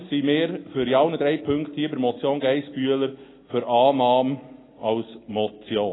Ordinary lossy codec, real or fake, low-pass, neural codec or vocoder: AAC, 16 kbps; real; 7.2 kHz; none